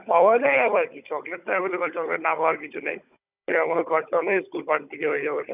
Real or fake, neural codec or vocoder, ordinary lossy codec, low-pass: fake; codec, 16 kHz, 16 kbps, FunCodec, trained on Chinese and English, 50 frames a second; none; 3.6 kHz